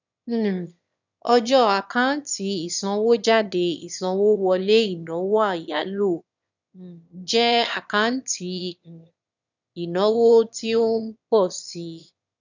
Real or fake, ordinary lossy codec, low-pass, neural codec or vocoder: fake; none; 7.2 kHz; autoencoder, 22.05 kHz, a latent of 192 numbers a frame, VITS, trained on one speaker